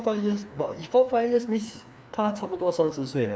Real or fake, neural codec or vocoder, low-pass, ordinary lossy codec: fake; codec, 16 kHz, 2 kbps, FreqCodec, larger model; none; none